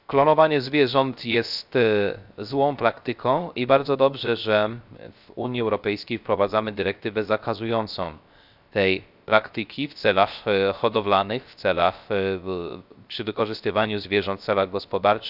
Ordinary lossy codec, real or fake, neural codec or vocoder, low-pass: none; fake; codec, 16 kHz, 0.3 kbps, FocalCodec; 5.4 kHz